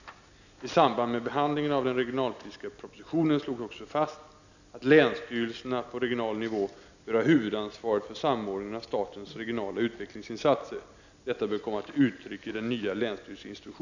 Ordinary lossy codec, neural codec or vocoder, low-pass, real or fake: none; none; 7.2 kHz; real